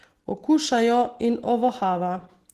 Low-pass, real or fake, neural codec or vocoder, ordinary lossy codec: 14.4 kHz; real; none; Opus, 16 kbps